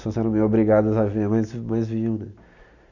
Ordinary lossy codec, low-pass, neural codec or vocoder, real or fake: none; 7.2 kHz; none; real